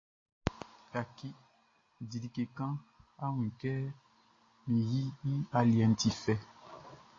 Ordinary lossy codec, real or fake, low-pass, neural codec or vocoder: AAC, 32 kbps; real; 7.2 kHz; none